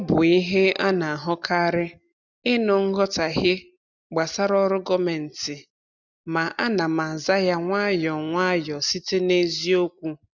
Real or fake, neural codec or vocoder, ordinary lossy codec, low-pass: real; none; none; 7.2 kHz